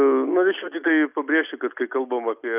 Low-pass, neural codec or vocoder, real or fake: 3.6 kHz; none; real